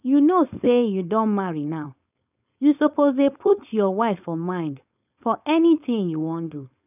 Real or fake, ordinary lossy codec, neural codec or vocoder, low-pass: fake; none; codec, 16 kHz, 4.8 kbps, FACodec; 3.6 kHz